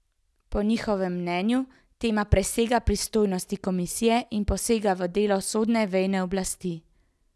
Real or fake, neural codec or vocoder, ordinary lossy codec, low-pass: real; none; none; none